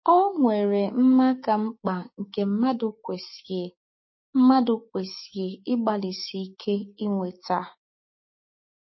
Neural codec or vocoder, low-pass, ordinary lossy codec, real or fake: codec, 16 kHz, 6 kbps, DAC; 7.2 kHz; MP3, 24 kbps; fake